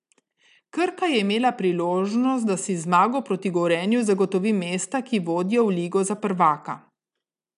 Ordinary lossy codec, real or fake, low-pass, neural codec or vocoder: none; real; 10.8 kHz; none